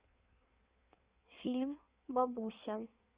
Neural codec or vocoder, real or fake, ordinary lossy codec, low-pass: codec, 16 kHz in and 24 kHz out, 1.1 kbps, FireRedTTS-2 codec; fake; Opus, 64 kbps; 3.6 kHz